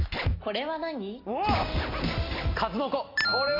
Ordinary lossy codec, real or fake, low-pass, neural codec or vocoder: AAC, 24 kbps; real; 5.4 kHz; none